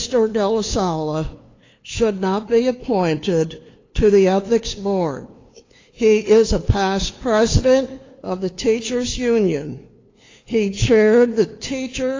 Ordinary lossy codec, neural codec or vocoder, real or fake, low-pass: AAC, 32 kbps; codec, 16 kHz, 2 kbps, FunCodec, trained on LibriTTS, 25 frames a second; fake; 7.2 kHz